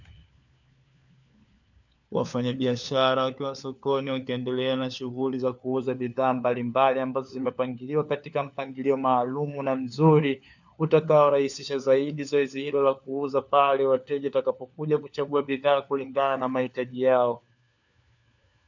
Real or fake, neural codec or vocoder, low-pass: fake; codec, 16 kHz, 4 kbps, FunCodec, trained on LibriTTS, 50 frames a second; 7.2 kHz